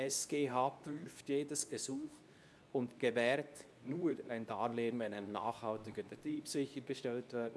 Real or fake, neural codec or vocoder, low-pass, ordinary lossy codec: fake; codec, 24 kHz, 0.9 kbps, WavTokenizer, medium speech release version 2; none; none